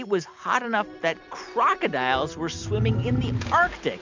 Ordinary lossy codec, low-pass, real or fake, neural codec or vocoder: MP3, 64 kbps; 7.2 kHz; real; none